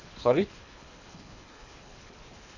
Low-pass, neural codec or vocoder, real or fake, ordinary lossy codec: 7.2 kHz; codec, 24 kHz, 3 kbps, HILCodec; fake; none